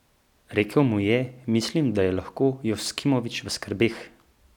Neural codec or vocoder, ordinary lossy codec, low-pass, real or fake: none; none; 19.8 kHz; real